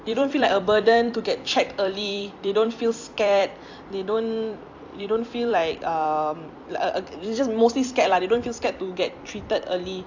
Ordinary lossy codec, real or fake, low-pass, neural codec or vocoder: AAC, 48 kbps; fake; 7.2 kHz; vocoder, 44.1 kHz, 128 mel bands every 256 samples, BigVGAN v2